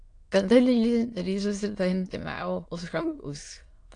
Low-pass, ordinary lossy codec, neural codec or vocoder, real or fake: 9.9 kHz; AAC, 48 kbps; autoencoder, 22.05 kHz, a latent of 192 numbers a frame, VITS, trained on many speakers; fake